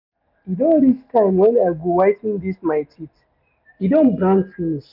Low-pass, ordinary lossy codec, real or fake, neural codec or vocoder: 5.4 kHz; none; real; none